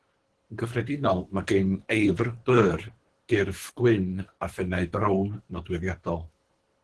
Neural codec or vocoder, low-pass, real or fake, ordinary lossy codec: codec, 24 kHz, 3 kbps, HILCodec; 10.8 kHz; fake; Opus, 16 kbps